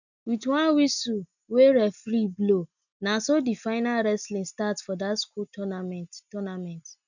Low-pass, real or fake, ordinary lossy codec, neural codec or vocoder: 7.2 kHz; real; none; none